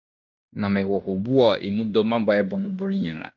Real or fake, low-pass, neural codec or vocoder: fake; 7.2 kHz; codec, 16 kHz in and 24 kHz out, 0.9 kbps, LongCat-Audio-Codec, fine tuned four codebook decoder